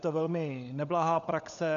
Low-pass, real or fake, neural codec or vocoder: 7.2 kHz; fake; codec, 16 kHz, 16 kbps, FunCodec, trained on LibriTTS, 50 frames a second